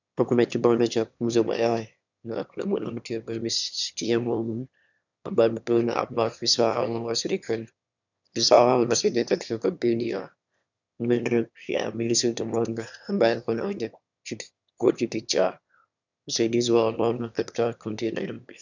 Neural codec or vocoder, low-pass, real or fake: autoencoder, 22.05 kHz, a latent of 192 numbers a frame, VITS, trained on one speaker; 7.2 kHz; fake